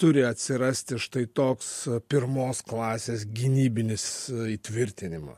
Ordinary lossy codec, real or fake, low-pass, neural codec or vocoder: MP3, 64 kbps; real; 14.4 kHz; none